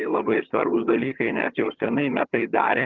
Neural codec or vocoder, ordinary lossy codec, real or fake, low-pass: vocoder, 22.05 kHz, 80 mel bands, HiFi-GAN; Opus, 32 kbps; fake; 7.2 kHz